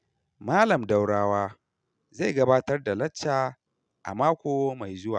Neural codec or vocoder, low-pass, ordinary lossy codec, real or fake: none; 9.9 kHz; none; real